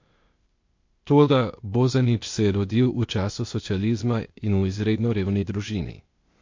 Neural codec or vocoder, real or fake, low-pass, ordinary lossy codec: codec, 16 kHz, 0.8 kbps, ZipCodec; fake; 7.2 kHz; MP3, 48 kbps